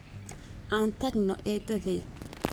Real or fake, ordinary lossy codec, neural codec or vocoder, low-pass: fake; none; codec, 44.1 kHz, 3.4 kbps, Pupu-Codec; none